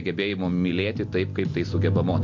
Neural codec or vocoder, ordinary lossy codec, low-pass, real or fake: none; MP3, 48 kbps; 7.2 kHz; real